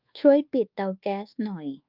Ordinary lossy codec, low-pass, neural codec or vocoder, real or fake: Opus, 24 kbps; 5.4 kHz; codec, 24 kHz, 1.2 kbps, DualCodec; fake